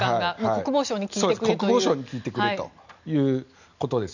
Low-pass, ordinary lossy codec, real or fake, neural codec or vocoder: 7.2 kHz; none; real; none